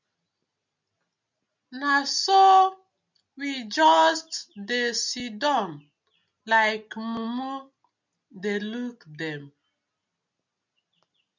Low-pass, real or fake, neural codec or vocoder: 7.2 kHz; real; none